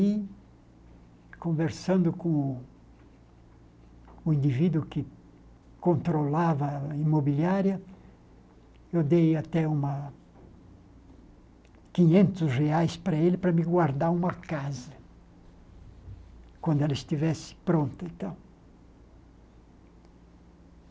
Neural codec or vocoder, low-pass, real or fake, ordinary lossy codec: none; none; real; none